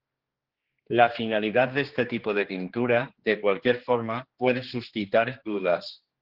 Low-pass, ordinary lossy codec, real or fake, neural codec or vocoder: 5.4 kHz; Opus, 16 kbps; fake; codec, 16 kHz, 2 kbps, X-Codec, HuBERT features, trained on general audio